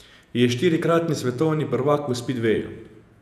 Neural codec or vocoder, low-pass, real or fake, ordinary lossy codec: vocoder, 48 kHz, 128 mel bands, Vocos; 14.4 kHz; fake; none